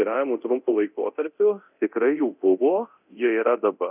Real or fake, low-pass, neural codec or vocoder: fake; 3.6 kHz; codec, 24 kHz, 0.9 kbps, DualCodec